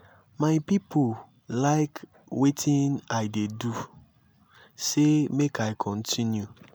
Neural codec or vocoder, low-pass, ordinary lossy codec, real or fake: none; none; none; real